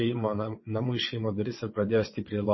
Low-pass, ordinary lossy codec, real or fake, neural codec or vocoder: 7.2 kHz; MP3, 24 kbps; fake; vocoder, 22.05 kHz, 80 mel bands, WaveNeXt